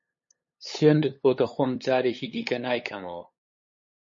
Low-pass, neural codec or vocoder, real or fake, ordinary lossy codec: 7.2 kHz; codec, 16 kHz, 8 kbps, FunCodec, trained on LibriTTS, 25 frames a second; fake; MP3, 32 kbps